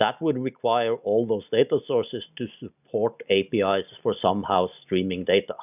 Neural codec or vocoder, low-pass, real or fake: none; 3.6 kHz; real